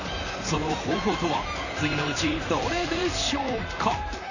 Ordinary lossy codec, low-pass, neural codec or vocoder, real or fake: none; 7.2 kHz; vocoder, 44.1 kHz, 128 mel bands, Pupu-Vocoder; fake